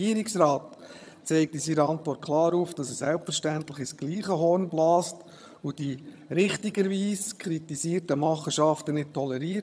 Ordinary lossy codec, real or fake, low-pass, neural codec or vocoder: none; fake; none; vocoder, 22.05 kHz, 80 mel bands, HiFi-GAN